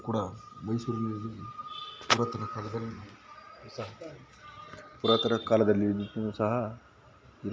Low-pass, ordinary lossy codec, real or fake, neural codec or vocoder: none; none; real; none